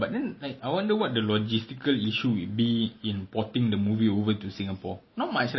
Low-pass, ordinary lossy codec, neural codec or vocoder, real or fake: 7.2 kHz; MP3, 24 kbps; none; real